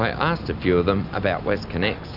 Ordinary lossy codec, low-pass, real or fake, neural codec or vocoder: Opus, 64 kbps; 5.4 kHz; real; none